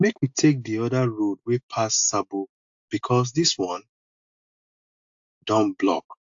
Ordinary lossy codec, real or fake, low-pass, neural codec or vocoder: AAC, 64 kbps; real; 7.2 kHz; none